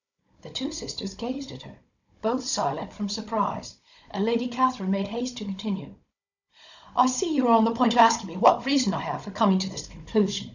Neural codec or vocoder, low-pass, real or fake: codec, 16 kHz, 16 kbps, FunCodec, trained on Chinese and English, 50 frames a second; 7.2 kHz; fake